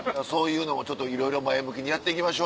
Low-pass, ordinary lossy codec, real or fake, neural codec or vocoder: none; none; real; none